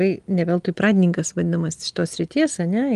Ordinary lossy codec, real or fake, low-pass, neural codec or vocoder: Opus, 24 kbps; real; 10.8 kHz; none